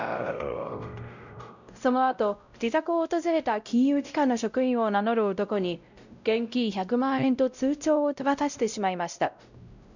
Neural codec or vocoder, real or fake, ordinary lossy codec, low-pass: codec, 16 kHz, 0.5 kbps, X-Codec, WavLM features, trained on Multilingual LibriSpeech; fake; none; 7.2 kHz